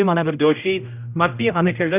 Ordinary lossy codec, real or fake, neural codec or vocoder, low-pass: none; fake; codec, 16 kHz, 0.5 kbps, X-Codec, HuBERT features, trained on general audio; 3.6 kHz